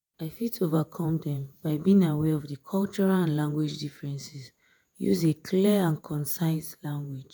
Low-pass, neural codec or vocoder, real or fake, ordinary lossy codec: none; vocoder, 48 kHz, 128 mel bands, Vocos; fake; none